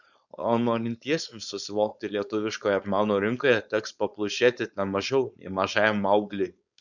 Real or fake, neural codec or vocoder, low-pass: fake; codec, 16 kHz, 4.8 kbps, FACodec; 7.2 kHz